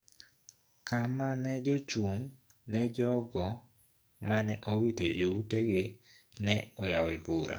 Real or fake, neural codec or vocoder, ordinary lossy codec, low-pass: fake; codec, 44.1 kHz, 2.6 kbps, SNAC; none; none